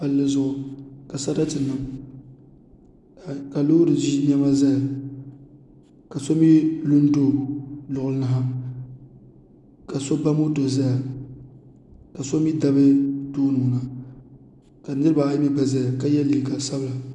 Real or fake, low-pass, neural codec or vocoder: real; 10.8 kHz; none